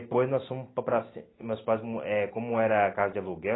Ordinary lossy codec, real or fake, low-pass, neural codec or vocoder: AAC, 16 kbps; real; 7.2 kHz; none